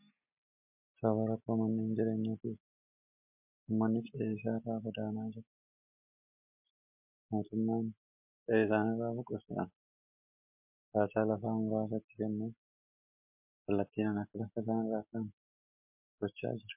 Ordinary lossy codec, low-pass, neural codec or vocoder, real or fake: MP3, 32 kbps; 3.6 kHz; none; real